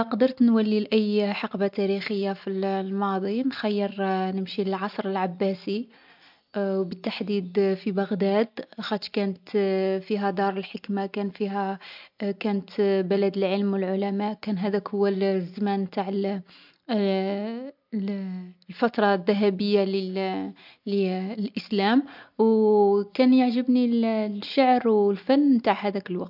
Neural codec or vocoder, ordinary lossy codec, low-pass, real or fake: none; MP3, 48 kbps; 5.4 kHz; real